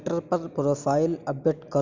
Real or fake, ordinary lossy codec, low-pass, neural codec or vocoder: real; none; 7.2 kHz; none